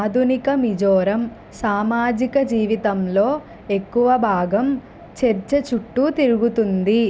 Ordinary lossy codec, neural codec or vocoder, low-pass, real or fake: none; none; none; real